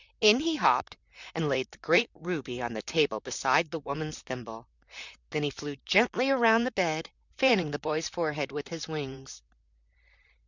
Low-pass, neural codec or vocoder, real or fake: 7.2 kHz; vocoder, 44.1 kHz, 128 mel bands, Pupu-Vocoder; fake